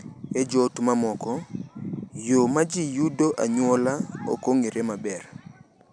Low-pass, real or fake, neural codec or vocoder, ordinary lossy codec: 9.9 kHz; real; none; none